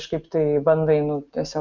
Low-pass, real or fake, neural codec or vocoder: 7.2 kHz; real; none